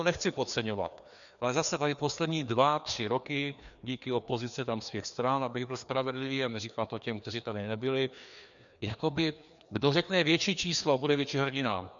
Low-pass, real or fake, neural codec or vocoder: 7.2 kHz; fake; codec, 16 kHz, 2 kbps, FreqCodec, larger model